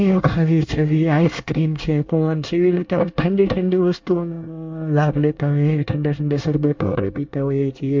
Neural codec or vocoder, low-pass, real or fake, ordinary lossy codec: codec, 24 kHz, 1 kbps, SNAC; 7.2 kHz; fake; MP3, 48 kbps